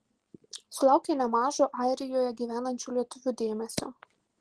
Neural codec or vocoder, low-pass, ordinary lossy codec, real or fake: none; 10.8 kHz; Opus, 16 kbps; real